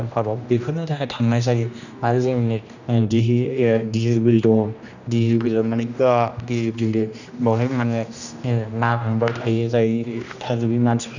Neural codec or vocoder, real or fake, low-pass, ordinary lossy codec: codec, 16 kHz, 1 kbps, X-Codec, HuBERT features, trained on general audio; fake; 7.2 kHz; none